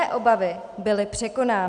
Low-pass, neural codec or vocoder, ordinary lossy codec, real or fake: 10.8 kHz; none; MP3, 96 kbps; real